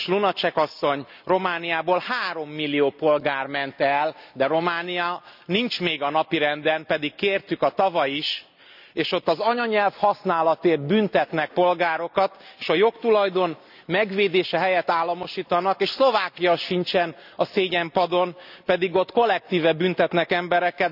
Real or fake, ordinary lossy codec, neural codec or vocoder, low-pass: real; none; none; 5.4 kHz